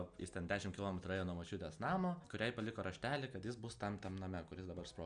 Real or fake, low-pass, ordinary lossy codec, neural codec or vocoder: real; 9.9 kHz; Opus, 64 kbps; none